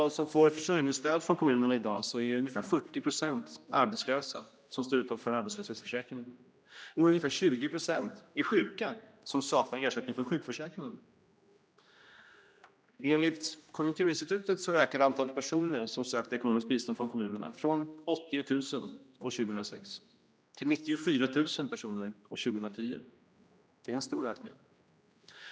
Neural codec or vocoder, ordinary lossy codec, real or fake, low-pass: codec, 16 kHz, 1 kbps, X-Codec, HuBERT features, trained on general audio; none; fake; none